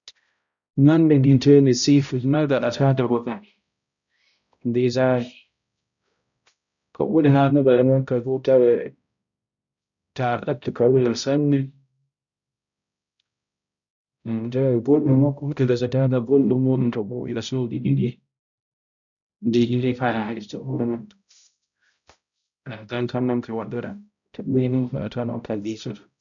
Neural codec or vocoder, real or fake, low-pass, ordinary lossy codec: codec, 16 kHz, 0.5 kbps, X-Codec, HuBERT features, trained on balanced general audio; fake; 7.2 kHz; none